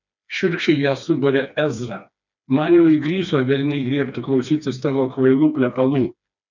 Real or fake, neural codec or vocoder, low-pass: fake; codec, 16 kHz, 2 kbps, FreqCodec, smaller model; 7.2 kHz